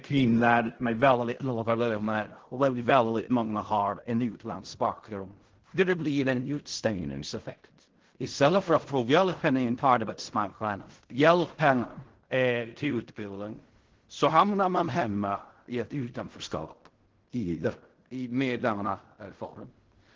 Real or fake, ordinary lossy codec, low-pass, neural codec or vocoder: fake; Opus, 16 kbps; 7.2 kHz; codec, 16 kHz in and 24 kHz out, 0.4 kbps, LongCat-Audio-Codec, fine tuned four codebook decoder